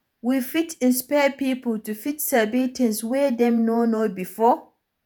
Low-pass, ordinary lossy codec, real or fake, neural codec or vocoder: none; none; fake; vocoder, 48 kHz, 128 mel bands, Vocos